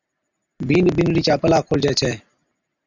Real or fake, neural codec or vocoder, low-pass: real; none; 7.2 kHz